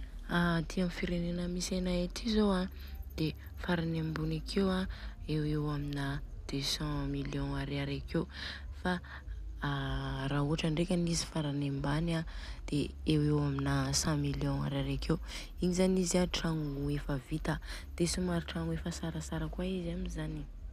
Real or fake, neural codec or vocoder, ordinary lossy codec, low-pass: real; none; none; 14.4 kHz